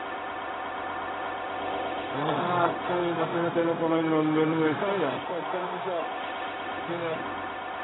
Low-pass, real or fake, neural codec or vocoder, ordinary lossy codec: 7.2 kHz; fake; codec, 16 kHz, 0.4 kbps, LongCat-Audio-Codec; AAC, 16 kbps